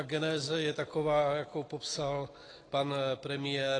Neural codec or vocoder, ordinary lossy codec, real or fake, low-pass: none; AAC, 32 kbps; real; 9.9 kHz